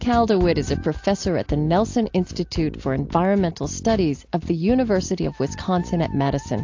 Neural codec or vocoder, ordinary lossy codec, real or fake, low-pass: none; AAC, 48 kbps; real; 7.2 kHz